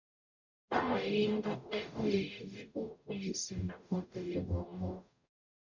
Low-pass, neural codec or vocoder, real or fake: 7.2 kHz; codec, 44.1 kHz, 0.9 kbps, DAC; fake